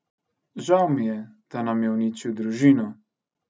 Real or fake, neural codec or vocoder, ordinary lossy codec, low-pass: real; none; none; none